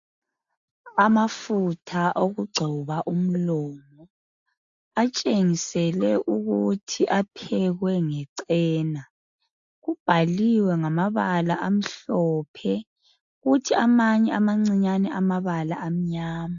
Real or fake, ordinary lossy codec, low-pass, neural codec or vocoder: real; AAC, 64 kbps; 7.2 kHz; none